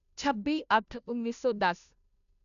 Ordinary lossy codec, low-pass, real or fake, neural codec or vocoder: none; 7.2 kHz; fake; codec, 16 kHz, 0.5 kbps, FunCodec, trained on Chinese and English, 25 frames a second